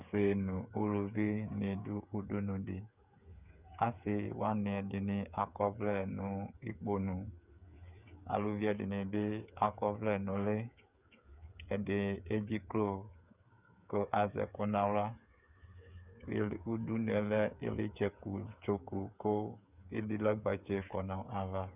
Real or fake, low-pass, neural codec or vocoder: fake; 3.6 kHz; codec, 16 kHz, 8 kbps, FreqCodec, smaller model